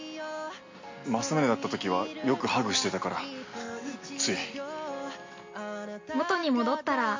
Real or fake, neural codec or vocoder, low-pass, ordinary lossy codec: real; none; 7.2 kHz; MP3, 48 kbps